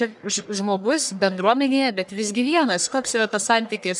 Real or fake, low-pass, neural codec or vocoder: fake; 10.8 kHz; codec, 44.1 kHz, 1.7 kbps, Pupu-Codec